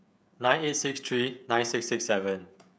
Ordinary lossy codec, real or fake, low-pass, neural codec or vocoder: none; fake; none; codec, 16 kHz, 16 kbps, FreqCodec, smaller model